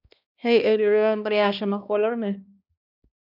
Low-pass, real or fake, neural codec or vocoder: 5.4 kHz; fake; codec, 16 kHz, 1 kbps, X-Codec, HuBERT features, trained on balanced general audio